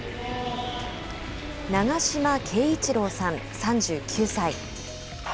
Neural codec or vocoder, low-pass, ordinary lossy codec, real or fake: none; none; none; real